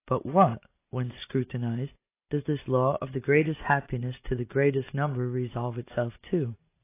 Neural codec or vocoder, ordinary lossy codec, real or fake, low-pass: none; AAC, 24 kbps; real; 3.6 kHz